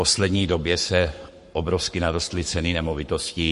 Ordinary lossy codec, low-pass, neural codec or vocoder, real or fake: MP3, 48 kbps; 14.4 kHz; codec, 44.1 kHz, 7.8 kbps, Pupu-Codec; fake